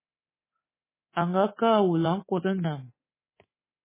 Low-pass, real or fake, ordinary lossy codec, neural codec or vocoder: 3.6 kHz; fake; MP3, 16 kbps; codec, 24 kHz, 3.1 kbps, DualCodec